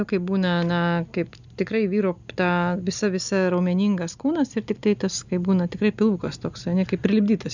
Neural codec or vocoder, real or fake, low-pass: none; real; 7.2 kHz